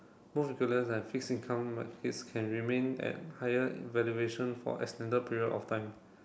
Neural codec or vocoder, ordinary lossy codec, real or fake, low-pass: none; none; real; none